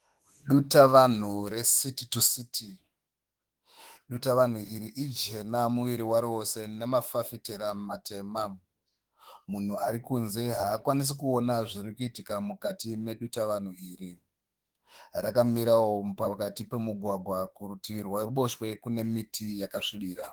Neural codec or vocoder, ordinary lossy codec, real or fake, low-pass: autoencoder, 48 kHz, 32 numbers a frame, DAC-VAE, trained on Japanese speech; Opus, 24 kbps; fake; 19.8 kHz